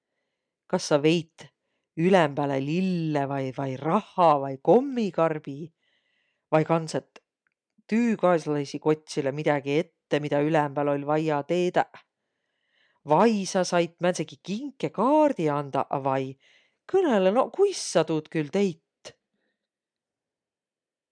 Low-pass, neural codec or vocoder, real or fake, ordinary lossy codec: 9.9 kHz; none; real; MP3, 96 kbps